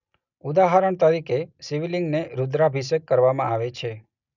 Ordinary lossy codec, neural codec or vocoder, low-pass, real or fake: none; none; 7.2 kHz; real